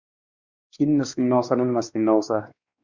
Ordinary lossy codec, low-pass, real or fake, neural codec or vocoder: Opus, 64 kbps; 7.2 kHz; fake; codec, 16 kHz, 2 kbps, X-Codec, WavLM features, trained on Multilingual LibriSpeech